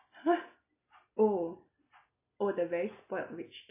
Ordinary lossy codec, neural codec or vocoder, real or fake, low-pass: AAC, 24 kbps; none; real; 3.6 kHz